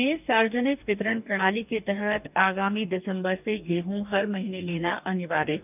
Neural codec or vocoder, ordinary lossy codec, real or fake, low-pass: codec, 44.1 kHz, 2.6 kbps, DAC; none; fake; 3.6 kHz